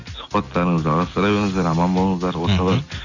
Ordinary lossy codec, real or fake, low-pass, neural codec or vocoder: none; real; 7.2 kHz; none